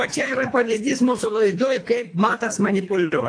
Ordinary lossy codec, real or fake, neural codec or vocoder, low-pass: AAC, 48 kbps; fake; codec, 24 kHz, 1.5 kbps, HILCodec; 9.9 kHz